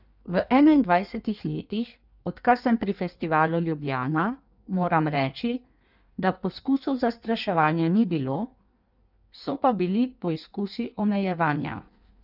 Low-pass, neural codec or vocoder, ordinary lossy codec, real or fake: 5.4 kHz; codec, 16 kHz in and 24 kHz out, 1.1 kbps, FireRedTTS-2 codec; none; fake